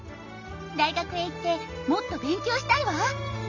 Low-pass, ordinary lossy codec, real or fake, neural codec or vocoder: 7.2 kHz; none; real; none